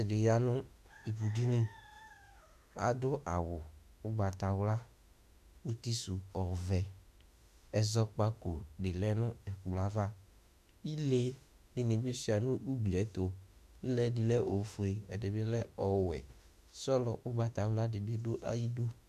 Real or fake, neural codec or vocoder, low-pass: fake; autoencoder, 48 kHz, 32 numbers a frame, DAC-VAE, trained on Japanese speech; 14.4 kHz